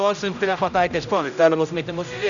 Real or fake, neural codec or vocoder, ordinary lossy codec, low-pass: fake; codec, 16 kHz, 0.5 kbps, X-Codec, HuBERT features, trained on general audio; MP3, 96 kbps; 7.2 kHz